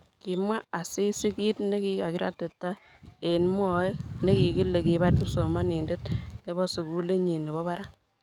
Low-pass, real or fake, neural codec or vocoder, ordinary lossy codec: 19.8 kHz; fake; codec, 44.1 kHz, 7.8 kbps, DAC; none